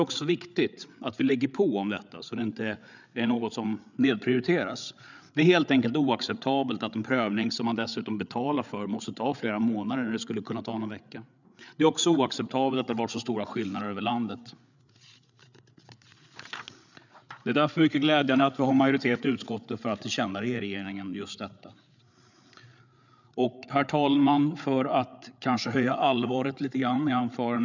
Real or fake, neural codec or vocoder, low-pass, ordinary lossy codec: fake; codec, 16 kHz, 8 kbps, FreqCodec, larger model; 7.2 kHz; none